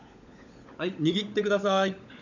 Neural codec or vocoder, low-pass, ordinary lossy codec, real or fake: codec, 16 kHz, 16 kbps, FunCodec, trained on LibriTTS, 50 frames a second; 7.2 kHz; none; fake